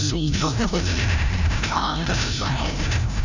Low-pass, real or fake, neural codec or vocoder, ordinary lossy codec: 7.2 kHz; fake; codec, 16 kHz, 0.5 kbps, FreqCodec, larger model; none